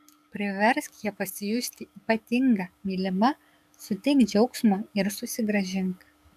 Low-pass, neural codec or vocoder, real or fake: 14.4 kHz; codec, 44.1 kHz, 7.8 kbps, DAC; fake